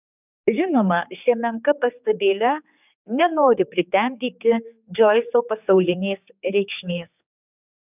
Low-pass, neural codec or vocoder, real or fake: 3.6 kHz; codec, 16 kHz, 4 kbps, X-Codec, HuBERT features, trained on general audio; fake